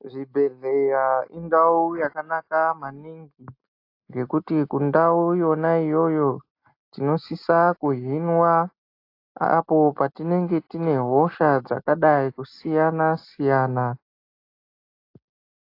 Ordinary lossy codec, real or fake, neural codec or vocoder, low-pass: AAC, 32 kbps; real; none; 5.4 kHz